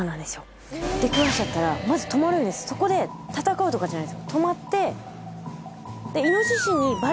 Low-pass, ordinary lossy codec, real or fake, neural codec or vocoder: none; none; real; none